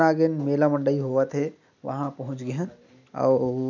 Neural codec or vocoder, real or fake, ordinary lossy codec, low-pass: none; real; none; 7.2 kHz